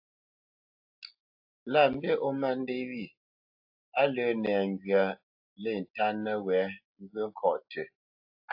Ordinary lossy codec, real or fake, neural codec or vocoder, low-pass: AAC, 48 kbps; real; none; 5.4 kHz